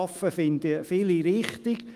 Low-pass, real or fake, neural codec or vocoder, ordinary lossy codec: 14.4 kHz; fake; autoencoder, 48 kHz, 128 numbers a frame, DAC-VAE, trained on Japanese speech; Opus, 32 kbps